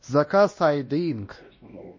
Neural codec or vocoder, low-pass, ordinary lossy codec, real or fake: codec, 16 kHz, 1 kbps, X-Codec, WavLM features, trained on Multilingual LibriSpeech; 7.2 kHz; MP3, 32 kbps; fake